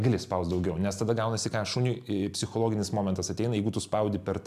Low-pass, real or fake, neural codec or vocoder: 14.4 kHz; real; none